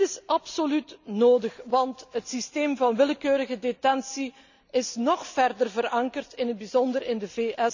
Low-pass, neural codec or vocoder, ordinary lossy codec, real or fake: 7.2 kHz; none; none; real